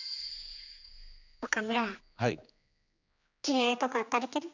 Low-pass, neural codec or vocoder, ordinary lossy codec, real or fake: 7.2 kHz; codec, 16 kHz, 2 kbps, X-Codec, HuBERT features, trained on general audio; none; fake